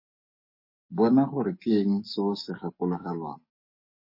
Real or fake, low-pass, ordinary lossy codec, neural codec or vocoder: fake; 5.4 kHz; MP3, 24 kbps; codec, 44.1 kHz, 7.8 kbps, DAC